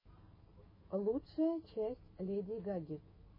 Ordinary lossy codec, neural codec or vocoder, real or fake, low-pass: MP3, 24 kbps; autoencoder, 48 kHz, 128 numbers a frame, DAC-VAE, trained on Japanese speech; fake; 5.4 kHz